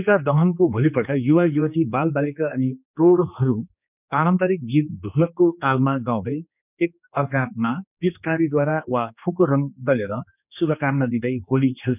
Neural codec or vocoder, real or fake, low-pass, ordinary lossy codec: codec, 16 kHz, 2 kbps, X-Codec, HuBERT features, trained on general audio; fake; 3.6 kHz; none